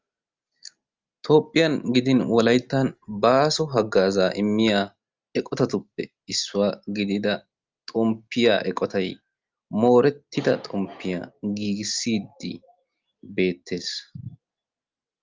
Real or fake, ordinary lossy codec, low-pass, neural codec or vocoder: real; Opus, 32 kbps; 7.2 kHz; none